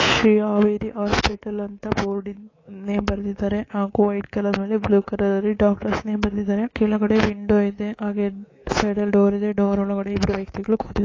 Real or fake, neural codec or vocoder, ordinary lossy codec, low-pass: fake; codec, 24 kHz, 3.1 kbps, DualCodec; AAC, 32 kbps; 7.2 kHz